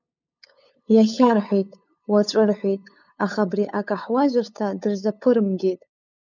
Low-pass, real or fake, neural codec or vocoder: 7.2 kHz; fake; codec, 16 kHz, 8 kbps, FunCodec, trained on LibriTTS, 25 frames a second